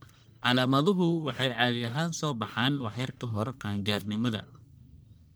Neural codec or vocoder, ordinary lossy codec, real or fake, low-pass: codec, 44.1 kHz, 1.7 kbps, Pupu-Codec; none; fake; none